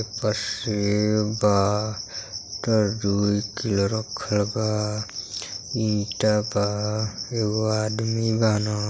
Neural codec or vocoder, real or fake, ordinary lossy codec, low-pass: none; real; none; none